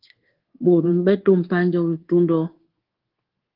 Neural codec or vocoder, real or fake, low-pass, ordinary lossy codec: codec, 24 kHz, 1.2 kbps, DualCodec; fake; 5.4 kHz; Opus, 16 kbps